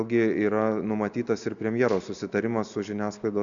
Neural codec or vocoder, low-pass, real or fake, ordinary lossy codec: none; 7.2 kHz; real; MP3, 96 kbps